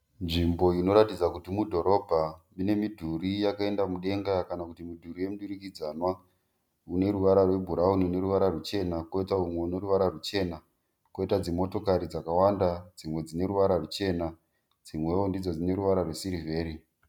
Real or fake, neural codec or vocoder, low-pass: real; none; 19.8 kHz